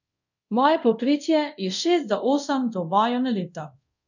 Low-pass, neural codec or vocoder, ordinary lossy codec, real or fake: 7.2 kHz; codec, 24 kHz, 0.5 kbps, DualCodec; none; fake